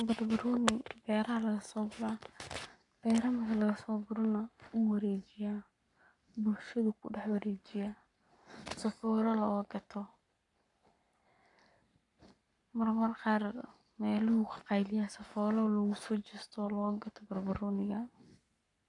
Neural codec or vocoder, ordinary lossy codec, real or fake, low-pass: codec, 44.1 kHz, 7.8 kbps, Pupu-Codec; none; fake; 10.8 kHz